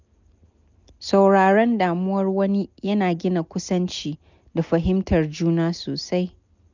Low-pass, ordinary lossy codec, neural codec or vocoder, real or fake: 7.2 kHz; none; none; real